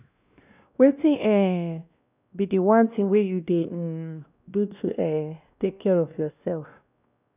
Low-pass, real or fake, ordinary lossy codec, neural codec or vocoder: 3.6 kHz; fake; none; codec, 16 kHz, 1 kbps, X-Codec, WavLM features, trained on Multilingual LibriSpeech